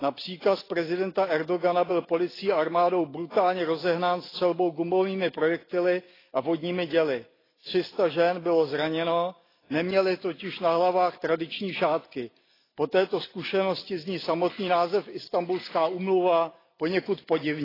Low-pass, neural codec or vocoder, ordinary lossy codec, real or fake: 5.4 kHz; none; AAC, 24 kbps; real